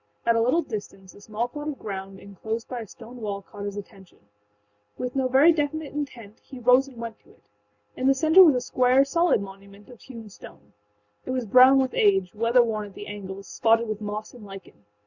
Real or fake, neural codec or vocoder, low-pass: real; none; 7.2 kHz